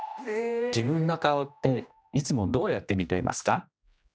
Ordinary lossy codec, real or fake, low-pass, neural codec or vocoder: none; fake; none; codec, 16 kHz, 1 kbps, X-Codec, HuBERT features, trained on general audio